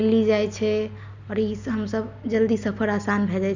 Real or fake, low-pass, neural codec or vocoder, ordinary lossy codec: real; 7.2 kHz; none; Opus, 64 kbps